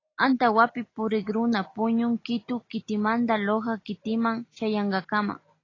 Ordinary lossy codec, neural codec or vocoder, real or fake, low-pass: AAC, 32 kbps; none; real; 7.2 kHz